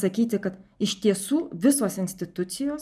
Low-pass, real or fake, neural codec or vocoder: 14.4 kHz; real; none